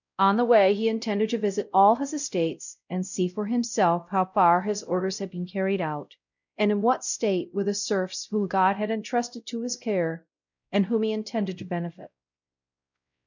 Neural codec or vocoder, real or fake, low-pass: codec, 16 kHz, 0.5 kbps, X-Codec, WavLM features, trained on Multilingual LibriSpeech; fake; 7.2 kHz